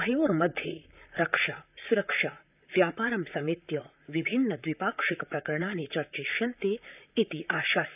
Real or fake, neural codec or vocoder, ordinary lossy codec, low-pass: fake; vocoder, 44.1 kHz, 128 mel bands, Pupu-Vocoder; none; 3.6 kHz